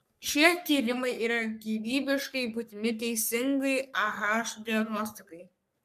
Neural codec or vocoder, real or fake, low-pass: codec, 44.1 kHz, 3.4 kbps, Pupu-Codec; fake; 14.4 kHz